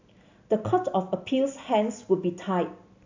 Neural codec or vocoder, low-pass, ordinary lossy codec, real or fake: none; 7.2 kHz; AAC, 48 kbps; real